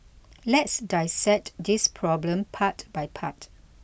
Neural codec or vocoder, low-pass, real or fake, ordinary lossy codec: none; none; real; none